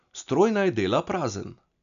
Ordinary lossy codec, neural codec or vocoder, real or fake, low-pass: none; none; real; 7.2 kHz